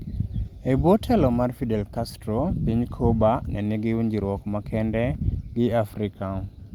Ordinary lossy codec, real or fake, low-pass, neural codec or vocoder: Opus, 32 kbps; real; 19.8 kHz; none